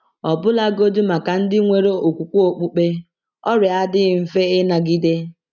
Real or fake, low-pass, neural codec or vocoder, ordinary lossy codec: real; 7.2 kHz; none; none